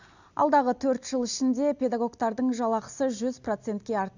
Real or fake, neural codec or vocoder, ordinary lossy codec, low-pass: real; none; none; 7.2 kHz